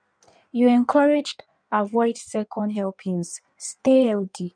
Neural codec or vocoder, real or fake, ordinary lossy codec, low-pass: codec, 16 kHz in and 24 kHz out, 1.1 kbps, FireRedTTS-2 codec; fake; none; 9.9 kHz